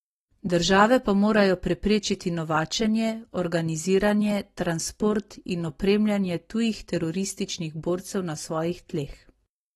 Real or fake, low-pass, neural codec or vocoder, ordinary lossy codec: real; 19.8 kHz; none; AAC, 32 kbps